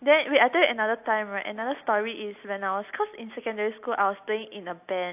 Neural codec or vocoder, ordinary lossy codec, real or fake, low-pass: none; AAC, 32 kbps; real; 3.6 kHz